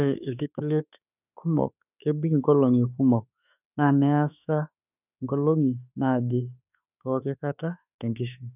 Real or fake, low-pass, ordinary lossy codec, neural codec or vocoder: fake; 3.6 kHz; none; autoencoder, 48 kHz, 32 numbers a frame, DAC-VAE, trained on Japanese speech